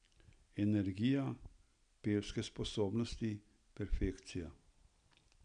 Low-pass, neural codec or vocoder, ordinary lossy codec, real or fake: 9.9 kHz; none; none; real